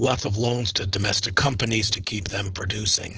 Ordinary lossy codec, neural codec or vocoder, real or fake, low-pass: Opus, 16 kbps; codec, 16 kHz, 4.8 kbps, FACodec; fake; 7.2 kHz